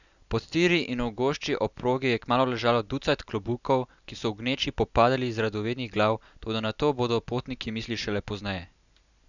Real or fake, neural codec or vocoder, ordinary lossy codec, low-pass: real; none; none; 7.2 kHz